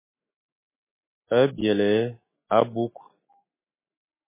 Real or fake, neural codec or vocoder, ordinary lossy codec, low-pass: fake; autoencoder, 48 kHz, 128 numbers a frame, DAC-VAE, trained on Japanese speech; MP3, 16 kbps; 3.6 kHz